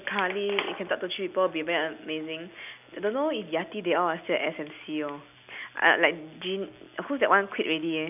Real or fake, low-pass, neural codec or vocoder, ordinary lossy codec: real; 3.6 kHz; none; none